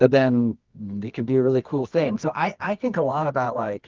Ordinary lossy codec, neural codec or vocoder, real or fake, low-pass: Opus, 24 kbps; codec, 24 kHz, 0.9 kbps, WavTokenizer, medium music audio release; fake; 7.2 kHz